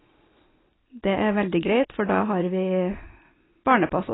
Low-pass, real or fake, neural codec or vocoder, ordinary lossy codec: 7.2 kHz; real; none; AAC, 16 kbps